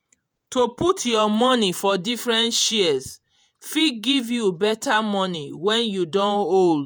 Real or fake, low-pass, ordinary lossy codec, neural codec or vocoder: fake; none; none; vocoder, 48 kHz, 128 mel bands, Vocos